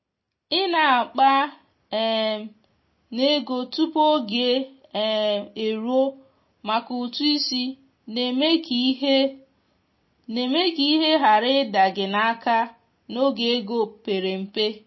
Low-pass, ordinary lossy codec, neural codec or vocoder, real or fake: 7.2 kHz; MP3, 24 kbps; none; real